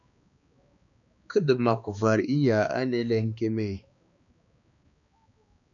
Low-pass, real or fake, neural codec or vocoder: 7.2 kHz; fake; codec, 16 kHz, 2 kbps, X-Codec, HuBERT features, trained on balanced general audio